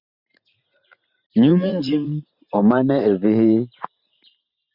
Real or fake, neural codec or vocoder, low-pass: fake; vocoder, 44.1 kHz, 128 mel bands every 512 samples, BigVGAN v2; 5.4 kHz